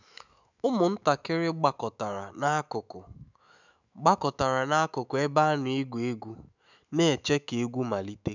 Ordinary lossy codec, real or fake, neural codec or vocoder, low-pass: none; fake; autoencoder, 48 kHz, 128 numbers a frame, DAC-VAE, trained on Japanese speech; 7.2 kHz